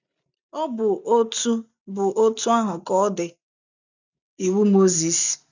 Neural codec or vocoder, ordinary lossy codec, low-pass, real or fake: none; none; 7.2 kHz; real